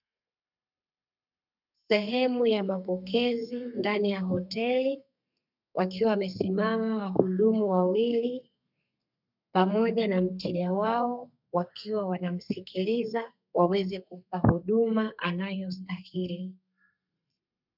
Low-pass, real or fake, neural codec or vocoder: 5.4 kHz; fake; codec, 32 kHz, 1.9 kbps, SNAC